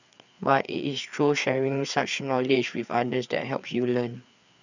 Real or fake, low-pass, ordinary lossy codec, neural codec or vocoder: fake; 7.2 kHz; none; codec, 16 kHz, 4 kbps, FreqCodec, larger model